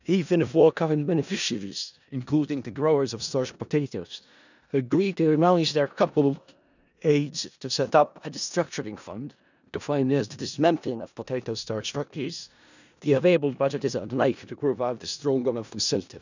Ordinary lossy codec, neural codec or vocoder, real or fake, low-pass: none; codec, 16 kHz in and 24 kHz out, 0.4 kbps, LongCat-Audio-Codec, four codebook decoder; fake; 7.2 kHz